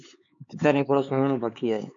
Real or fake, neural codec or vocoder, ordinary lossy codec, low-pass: fake; codec, 16 kHz, 4 kbps, X-Codec, HuBERT features, trained on LibriSpeech; AAC, 64 kbps; 7.2 kHz